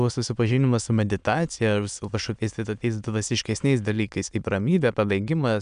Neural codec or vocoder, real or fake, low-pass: autoencoder, 22.05 kHz, a latent of 192 numbers a frame, VITS, trained on many speakers; fake; 9.9 kHz